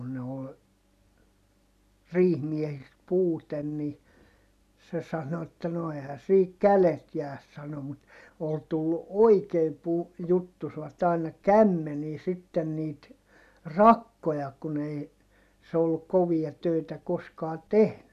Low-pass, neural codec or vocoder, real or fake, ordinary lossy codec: 14.4 kHz; none; real; none